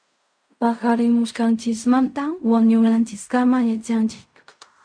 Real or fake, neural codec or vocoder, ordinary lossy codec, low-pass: fake; codec, 16 kHz in and 24 kHz out, 0.4 kbps, LongCat-Audio-Codec, fine tuned four codebook decoder; MP3, 96 kbps; 9.9 kHz